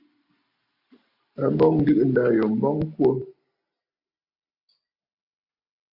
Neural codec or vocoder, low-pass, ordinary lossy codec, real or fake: none; 5.4 kHz; MP3, 32 kbps; real